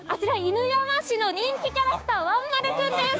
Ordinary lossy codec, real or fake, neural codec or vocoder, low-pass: none; fake; codec, 16 kHz, 6 kbps, DAC; none